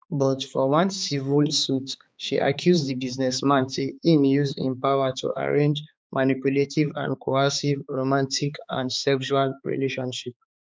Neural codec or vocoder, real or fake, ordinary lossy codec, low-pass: codec, 16 kHz, 4 kbps, X-Codec, HuBERT features, trained on balanced general audio; fake; none; none